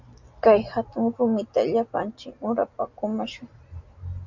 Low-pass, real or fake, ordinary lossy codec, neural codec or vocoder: 7.2 kHz; real; Opus, 64 kbps; none